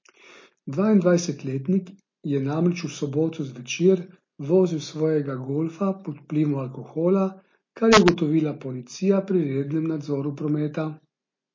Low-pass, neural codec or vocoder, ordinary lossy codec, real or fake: 7.2 kHz; none; MP3, 32 kbps; real